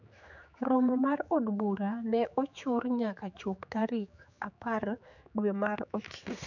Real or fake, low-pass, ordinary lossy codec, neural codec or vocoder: fake; 7.2 kHz; none; codec, 16 kHz, 4 kbps, X-Codec, HuBERT features, trained on general audio